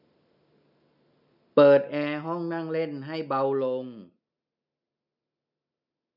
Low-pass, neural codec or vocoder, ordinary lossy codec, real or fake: 5.4 kHz; none; none; real